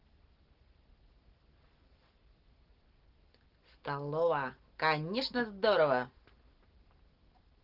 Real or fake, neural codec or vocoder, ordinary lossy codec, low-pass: real; none; Opus, 16 kbps; 5.4 kHz